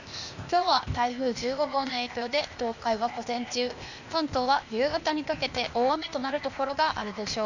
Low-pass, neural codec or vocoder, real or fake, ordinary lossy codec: 7.2 kHz; codec, 16 kHz, 0.8 kbps, ZipCodec; fake; none